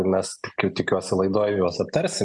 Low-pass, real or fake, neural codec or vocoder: 10.8 kHz; real; none